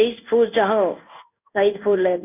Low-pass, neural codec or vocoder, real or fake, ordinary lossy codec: 3.6 kHz; codec, 16 kHz in and 24 kHz out, 1 kbps, XY-Tokenizer; fake; none